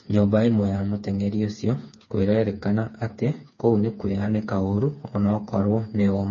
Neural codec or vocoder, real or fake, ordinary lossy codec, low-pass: codec, 16 kHz, 4 kbps, FreqCodec, smaller model; fake; MP3, 32 kbps; 7.2 kHz